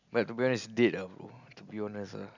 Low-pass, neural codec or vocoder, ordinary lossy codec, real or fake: 7.2 kHz; none; none; real